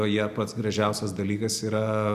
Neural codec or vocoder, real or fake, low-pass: none; real; 14.4 kHz